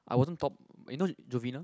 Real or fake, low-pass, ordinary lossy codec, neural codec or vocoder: real; none; none; none